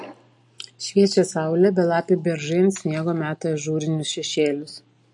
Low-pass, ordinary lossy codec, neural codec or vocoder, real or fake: 10.8 kHz; MP3, 48 kbps; none; real